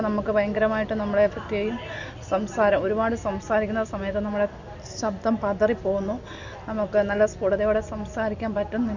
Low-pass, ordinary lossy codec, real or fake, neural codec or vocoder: 7.2 kHz; Opus, 64 kbps; real; none